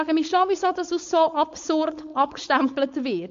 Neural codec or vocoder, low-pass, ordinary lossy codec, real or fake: codec, 16 kHz, 4.8 kbps, FACodec; 7.2 kHz; MP3, 48 kbps; fake